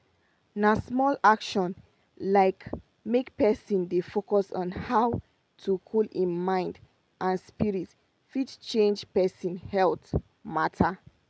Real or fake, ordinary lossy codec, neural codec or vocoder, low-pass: real; none; none; none